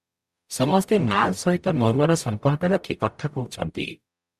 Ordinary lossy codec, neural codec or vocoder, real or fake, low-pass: Opus, 64 kbps; codec, 44.1 kHz, 0.9 kbps, DAC; fake; 14.4 kHz